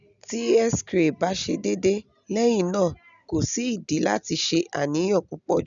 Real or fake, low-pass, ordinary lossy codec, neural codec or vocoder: real; 7.2 kHz; none; none